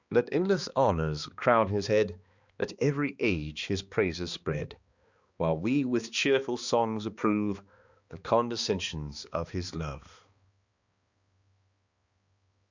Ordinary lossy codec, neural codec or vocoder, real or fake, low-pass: Opus, 64 kbps; codec, 16 kHz, 2 kbps, X-Codec, HuBERT features, trained on balanced general audio; fake; 7.2 kHz